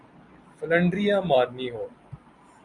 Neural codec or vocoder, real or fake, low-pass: vocoder, 44.1 kHz, 128 mel bands every 256 samples, BigVGAN v2; fake; 10.8 kHz